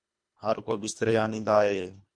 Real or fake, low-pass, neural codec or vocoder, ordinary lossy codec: fake; 9.9 kHz; codec, 24 kHz, 1.5 kbps, HILCodec; MP3, 64 kbps